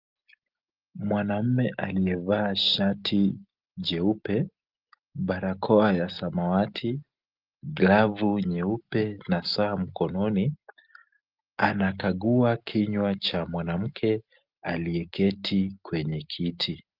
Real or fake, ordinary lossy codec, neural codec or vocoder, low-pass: real; Opus, 32 kbps; none; 5.4 kHz